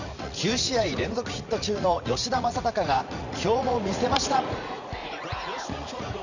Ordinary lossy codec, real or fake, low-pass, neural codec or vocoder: none; fake; 7.2 kHz; vocoder, 44.1 kHz, 80 mel bands, Vocos